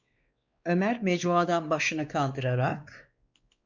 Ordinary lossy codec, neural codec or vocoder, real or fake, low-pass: Opus, 64 kbps; codec, 16 kHz, 2 kbps, X-Codec, WavLM features, trained on Multilingual LibriSpeech; fake; 7.2 kHz